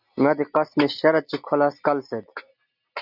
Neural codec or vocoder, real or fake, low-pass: none; real; 5.4 kHz